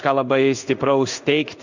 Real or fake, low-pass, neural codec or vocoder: fake; 7.2 kHz; codec, 16 kHz in and 24 kHz out, 1 kbps, XY-Tokenizer